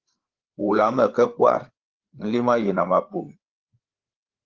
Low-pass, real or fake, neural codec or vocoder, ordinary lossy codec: 7.2 kHz; fake; codec, 16 kHz, 8 kbps, FreqCodec, larger model; Opus, 16 kbps